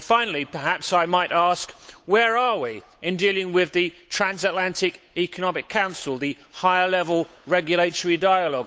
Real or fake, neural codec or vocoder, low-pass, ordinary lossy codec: fake; codec, 16 kHz, 8 kbps, FunCodec, trained on Chinese and English, 25 frames a second; none; none